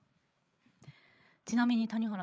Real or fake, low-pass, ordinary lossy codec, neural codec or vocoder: fake; none; none; codec, 16 kHz, 8 kbps, FreqCodec, larger model